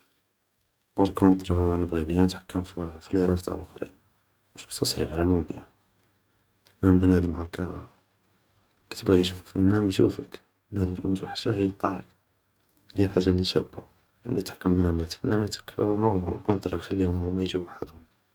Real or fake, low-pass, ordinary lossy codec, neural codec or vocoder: fake; none; none; codec, 44.1 kHz, 2.6 kbps, DAC